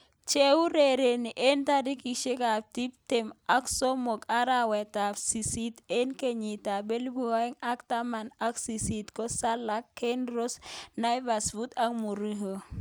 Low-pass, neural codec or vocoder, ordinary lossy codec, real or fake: none; none; none; real